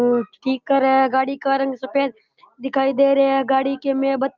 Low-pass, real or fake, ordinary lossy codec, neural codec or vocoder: 7.2 kHz; real; Opus, 32 kbps; none